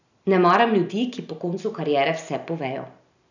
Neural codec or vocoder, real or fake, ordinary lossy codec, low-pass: none; real; none; 7.2 kHz